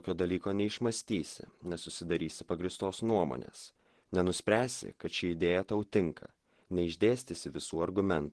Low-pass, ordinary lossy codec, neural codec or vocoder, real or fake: 10.8 kHz; Opus, 16 kbps; vocoder, 48 kHz, 128 mel bands, Vocos; fake